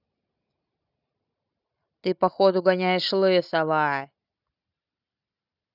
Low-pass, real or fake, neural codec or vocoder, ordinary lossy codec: 5.4 kHz; real; none; none